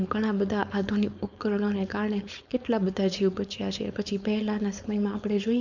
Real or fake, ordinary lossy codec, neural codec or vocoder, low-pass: fake; none; codec, 16 kHz, 4.8 kbps, FACodec; 7.2 kHz